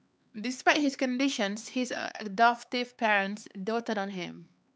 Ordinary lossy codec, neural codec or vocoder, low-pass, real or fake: none; codec, 16 kHz, 4 kbps, X-Codec, HuBERT features, trained on LibriSpeech; none; fake